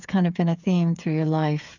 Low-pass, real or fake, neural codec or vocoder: 7.2 kHz; fake; codec, 16 kHz, 8 kbps, FreqCodec, smaller model